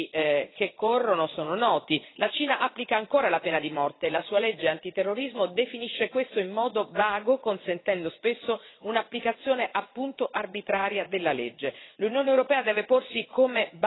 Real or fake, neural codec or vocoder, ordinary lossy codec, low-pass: fake; vocoder, 22.05 kHz, 80 mel bands, WaveNeXt; AAC, 16 kbps; 7.2 kHz